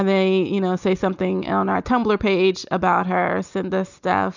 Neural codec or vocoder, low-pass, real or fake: none; 7.2 kHz; real